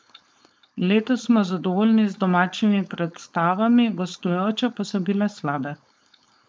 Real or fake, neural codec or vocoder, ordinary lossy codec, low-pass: fake; codec, 16 kHz, 4.8 kbps, FACodec; none; none